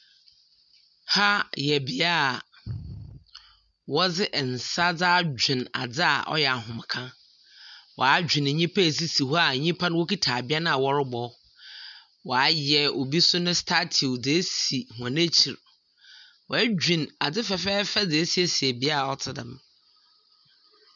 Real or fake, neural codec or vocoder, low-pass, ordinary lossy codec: real; none; 7.2 kHz; MP3, 96 kbps